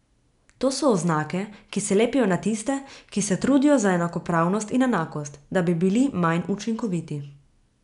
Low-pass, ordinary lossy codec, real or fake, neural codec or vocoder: 10.8 kHz; none; real; none